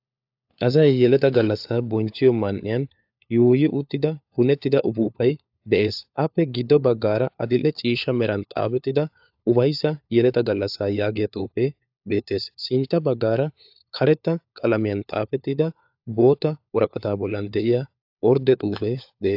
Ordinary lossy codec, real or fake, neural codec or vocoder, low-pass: AAC, 48 kbps; fake; codec, 16 kHz, 4 kbps, FunCodec, trained on LibriTTS, 50 frames a second; 5.4 kHz